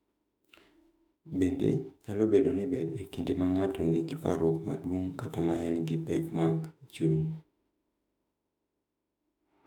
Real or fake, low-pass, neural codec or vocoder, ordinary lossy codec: fake; 19.8 kHz; autoencoder, 48 kHz, 32 numbers a frame, DAC-VAE, trained on Japanese speech; none